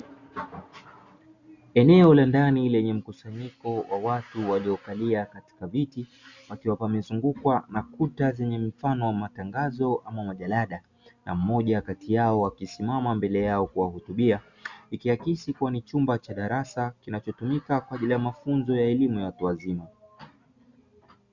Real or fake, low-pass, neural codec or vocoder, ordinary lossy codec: real; 7.2 kHz; none; Opus, 64 kbps